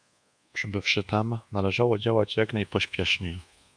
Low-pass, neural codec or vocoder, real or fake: 9.9 kHz; codec, 24 kHz, 1.2 kbps, DualCodec; fake